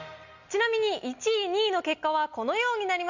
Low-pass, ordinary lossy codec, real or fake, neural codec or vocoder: 7.2 kHz; Opus, 64 kbps; real; none